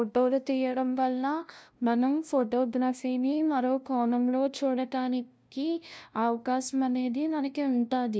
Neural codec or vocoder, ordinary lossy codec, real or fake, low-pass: codec, 16 kHz, 0.5 kbps, FunCodec, trained on LibriTTS, 25 frames a second; none; fake; none